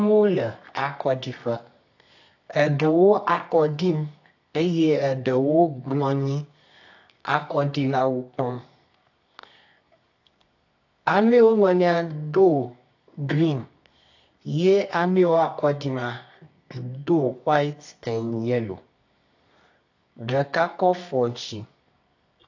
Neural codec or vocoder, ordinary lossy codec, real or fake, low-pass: codec, 24 kHz, 0.9 kbps, WavTokenizer, medium music audio release; AAC, 48 kbps; fake; 7.2 kHz